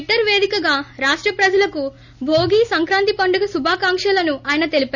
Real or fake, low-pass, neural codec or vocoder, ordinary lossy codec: real; 7.2 kHz; none; none